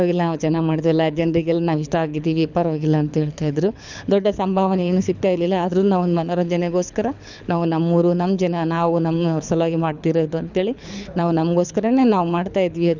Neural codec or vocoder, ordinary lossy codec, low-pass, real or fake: codec, 24 kHz, 6 kbps, HILCodec; none; 7.2 kHz; fake